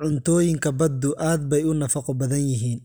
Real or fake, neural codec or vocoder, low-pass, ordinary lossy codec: real; none; none; none